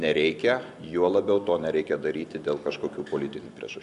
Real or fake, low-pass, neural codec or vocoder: real; 10.8 kHz; none